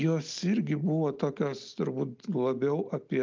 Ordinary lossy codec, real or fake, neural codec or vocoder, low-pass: Opus, 24 kbps; real; none; 7.2 kHz